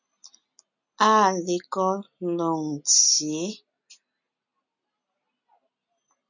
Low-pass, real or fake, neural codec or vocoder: 7.2 kHz; real; none